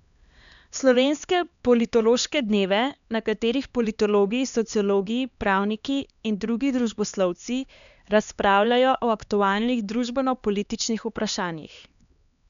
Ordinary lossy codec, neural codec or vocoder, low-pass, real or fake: MP3, 96 kbps; codec, 16 kHz, 2 kbps, X-Codec, HuBERT features, trained on LibriSpeech; 7.2 kHz; fake